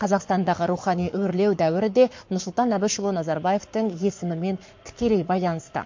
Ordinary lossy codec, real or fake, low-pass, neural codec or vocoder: MP3, 48 kbps; fake; 7.2 kHz; codec, 44.1 kHz, 7.8 kbps, Pupu-Codec